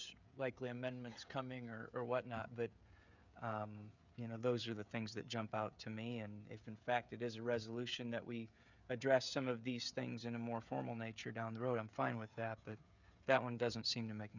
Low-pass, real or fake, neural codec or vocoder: 7.2 kHz; fake; codec, 16 kHz, 16 kbps, FreqCodec, smaller model